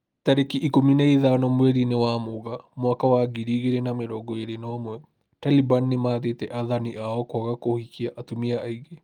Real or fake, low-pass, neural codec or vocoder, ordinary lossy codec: real; 19.8 kHz; none; Opus, 32 kbps